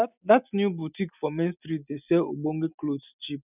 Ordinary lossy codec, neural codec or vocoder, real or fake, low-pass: none; none; real; 3.6 kHz